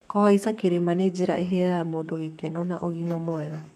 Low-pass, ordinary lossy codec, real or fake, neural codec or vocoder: 14.4 kHz; none; fake; codec, 32 kHz, 1.9 kbps, SNAC